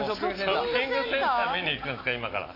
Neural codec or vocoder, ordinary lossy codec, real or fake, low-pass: none; AAC, 24 kbps; real; 5.4 kHz